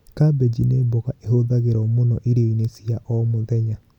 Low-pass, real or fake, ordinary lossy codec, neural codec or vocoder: 19.8 kHz; real; none; none